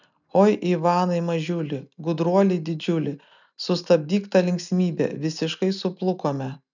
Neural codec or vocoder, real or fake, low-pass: none; real; 7.2 kHz